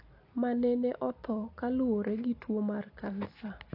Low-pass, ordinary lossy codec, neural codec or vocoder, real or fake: 5.4 kHz; AAC, 32 kbps; none; real